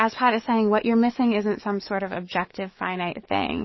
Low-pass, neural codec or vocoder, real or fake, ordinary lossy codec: 7.2 kHz; codec, 44.1 kHz, 7.8 kbps, DAC; fake; MP3, 24 kbps